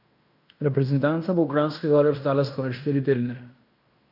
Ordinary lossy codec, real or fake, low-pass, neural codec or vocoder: none; fake; 5.4 kHz; codec, 16 kHz in and 24 kHz out, 0.9 kbps, LongCat-Audio-Codec, fine tuned four codebook decoder